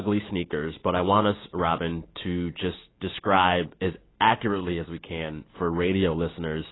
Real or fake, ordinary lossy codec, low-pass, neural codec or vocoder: real; AAC, 16 kbps; 7.2 kHz; none